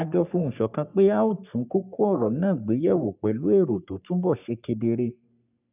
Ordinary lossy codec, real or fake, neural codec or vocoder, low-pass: none; fake; codec, 44.1 kHz, 3.4 kbps, Pupu-Codec; 3.6 kHz